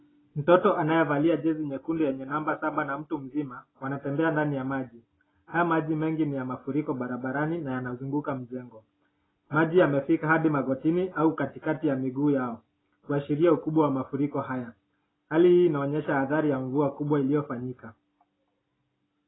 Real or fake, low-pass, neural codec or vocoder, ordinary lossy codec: real; 7.2 kHz; none; AAC, 16 kbps